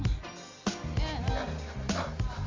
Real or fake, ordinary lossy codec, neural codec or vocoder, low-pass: real; MP3, 48 kbps; none; 7.2 kHz